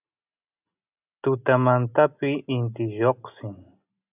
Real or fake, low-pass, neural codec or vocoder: real; 3.6 kHz; none